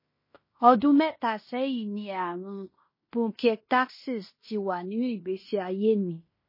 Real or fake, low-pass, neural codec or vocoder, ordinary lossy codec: fake; 5.4 kHz; codec, 16 kHz in and 24 kHz out, 0.9 kbps, LongCat-Audio-Codec, fine tuned four codebook decoder; MP3, 24 kbps